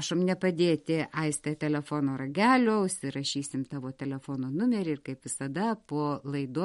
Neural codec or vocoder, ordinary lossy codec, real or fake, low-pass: none; MP3, 48 kbps; real; 19.8 kHz